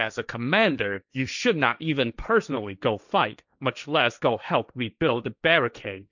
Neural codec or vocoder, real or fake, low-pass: codec, 16 kHz, 1.1 kbps, Voila-Tokenizer; fake; 7.2 kHz